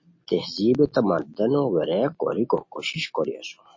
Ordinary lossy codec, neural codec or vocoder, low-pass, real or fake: MP3, 32 kbps; none; 7.2 kHz; real